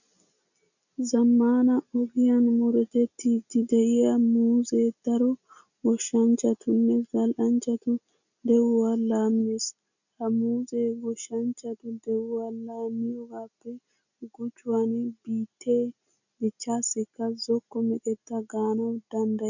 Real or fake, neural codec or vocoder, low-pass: real; none; 7.2 kHz